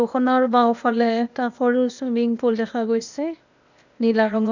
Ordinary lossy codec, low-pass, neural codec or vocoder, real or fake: none; 7.2 kHz; codec, 16 kHz, 0.8 kbps, ZipCodec; fake